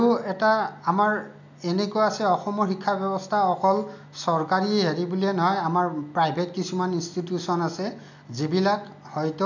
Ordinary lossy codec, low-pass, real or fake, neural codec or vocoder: none; 7.2 kHz; real; none